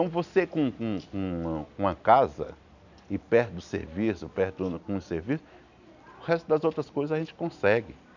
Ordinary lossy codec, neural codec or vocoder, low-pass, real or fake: none; none; 7.2 kHz; real